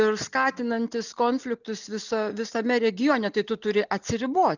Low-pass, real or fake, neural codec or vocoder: 7.2 kHz; real; none